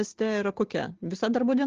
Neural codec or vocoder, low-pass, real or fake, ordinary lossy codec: none; 7.2 kHz; real; Opus, 16 kbps